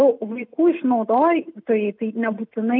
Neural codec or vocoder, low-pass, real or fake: none; 5.4 kHz; real